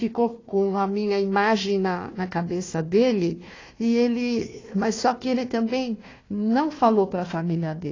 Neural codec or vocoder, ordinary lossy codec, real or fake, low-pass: codec, 16 kHz, 1 kbps, FunCodec, trained on Chinese and English, 50 frames a second; AAC, 32 kbps; fake; 7.2 kHz